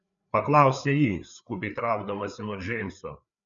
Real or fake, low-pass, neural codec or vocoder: fake; 7.2 kHz; codec, 16 kHz, 4 kbps, FreqCodec, larger model